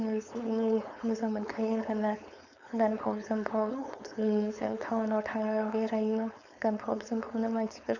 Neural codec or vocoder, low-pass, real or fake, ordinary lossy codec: codec, 16 kHz, 4.8 kbps, FACodec; 7.2 kHz; fake; none